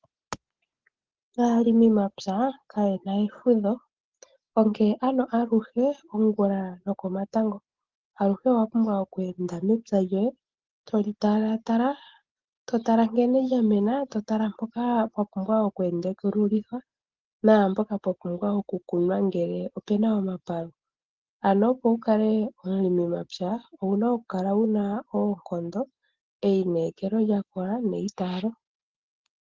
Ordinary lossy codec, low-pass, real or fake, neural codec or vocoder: Opus, 16 kbps; 7.2 kHz; real; none